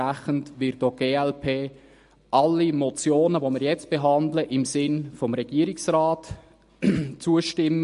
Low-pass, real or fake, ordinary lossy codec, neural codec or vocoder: 10.8 kHz; real; MP3, 48 kbps; none